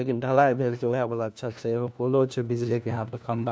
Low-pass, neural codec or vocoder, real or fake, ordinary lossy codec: none; codec, 16 kHz, 1 kbps, FunCodec, trained on LibriTTS, 50 frames a second; fake; none